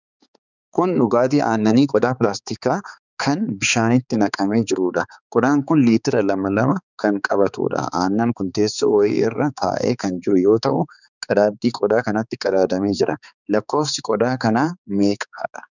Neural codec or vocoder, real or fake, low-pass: codec, 16 kHz, 4 kbps, X-Codec, HuBERT features, trained on balanced general audio; fake; 7.2 kHz